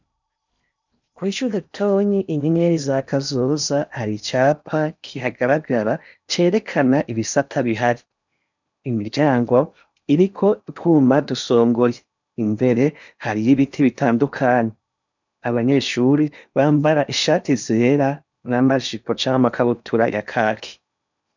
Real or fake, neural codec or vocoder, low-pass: fake; codec, 16 kHz in and 24 kHz out, 0.8 kbps, FocalCodec, streaming, 65536 codes; 7.2 kHz